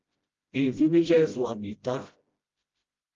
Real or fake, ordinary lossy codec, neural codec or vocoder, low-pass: fake; Opus, 32 kbps; codec, 16 kHz, 0.5 kbps, FreqCodec, smaller model; 7.2 kHz